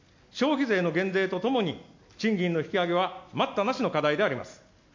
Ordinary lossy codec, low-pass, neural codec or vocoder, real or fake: MP3, 48 kbps; 7.2 kHz; none; real